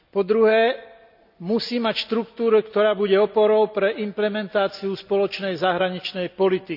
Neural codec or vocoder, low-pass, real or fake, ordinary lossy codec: none; 5.4 kHz; real; none